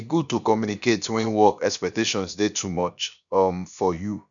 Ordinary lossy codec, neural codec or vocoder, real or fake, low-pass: none; codec, 16 kHz, about 1 kbps, DyCAST, with the encoder's durations; fake; 7.2 kHz